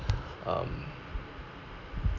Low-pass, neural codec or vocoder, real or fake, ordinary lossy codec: 7.2 kHz; none; real; none